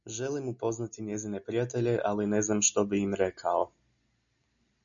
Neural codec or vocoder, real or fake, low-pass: none; real; 7.2 kHz